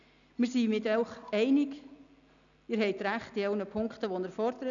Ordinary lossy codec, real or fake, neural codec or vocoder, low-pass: none; real; none; 7.2 kHz